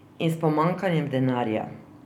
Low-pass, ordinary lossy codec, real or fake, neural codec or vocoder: 19.8 kHz; none; real; none